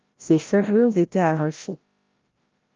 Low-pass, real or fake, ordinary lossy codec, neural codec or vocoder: 7.2 kHz; fake; Opus, 32 kbps; codec, 16 kHz, 0.5 kbps, FreqCodec, larger model